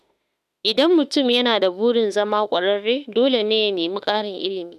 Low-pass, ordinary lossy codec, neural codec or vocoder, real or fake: 14.4 kHz; none; autoencoder, 48 kHz, 32 numbers a frame, DAC-VAE, trained on Japanese speech; fake